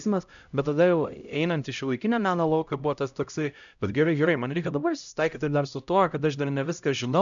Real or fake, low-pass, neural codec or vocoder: fake; 7.2 kHz; codec, 16 kHz, 0.5 kbps, X-Codec, HuBERT features, trained on LibriSpeech